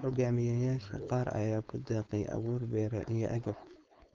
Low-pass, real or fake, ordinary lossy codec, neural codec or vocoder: 7.2 kHz; fake; Opus, 32 kbps; codec, 16 kHz, 4.8 kbps, FACodec